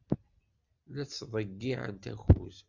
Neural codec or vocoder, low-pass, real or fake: none; 7.2 kHz; real